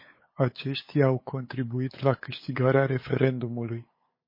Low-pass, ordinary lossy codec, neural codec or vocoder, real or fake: 5.4 kHz; MP3, 24 kbps; codec, 16 kHz, 8 kbps, FunCodec, trained on LibriTTS, 25 frames a second; fake